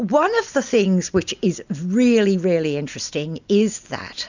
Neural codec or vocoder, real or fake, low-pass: none; real; 7.2 kHz